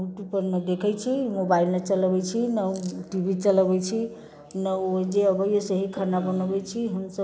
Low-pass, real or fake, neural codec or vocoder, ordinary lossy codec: none; real; none; none